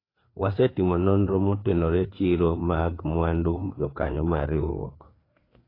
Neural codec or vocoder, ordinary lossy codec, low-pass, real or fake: codec, 16 kHz, 4 kbps, FreqCodec, larger model; AAC, 24 kbps; 5.4 kHz; fake